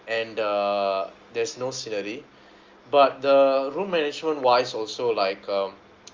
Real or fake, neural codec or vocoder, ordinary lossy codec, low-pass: real; none; Opus, 32 kbps; 7.2 kHz